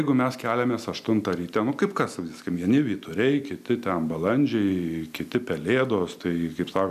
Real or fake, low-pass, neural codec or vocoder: real; 14.4 kHz; none